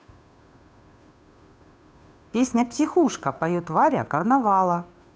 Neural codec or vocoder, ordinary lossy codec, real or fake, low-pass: codec, 16 kHz, 2 kbps, FunCodec, trained on Chinese and English, 25 frames a second; none; fake; none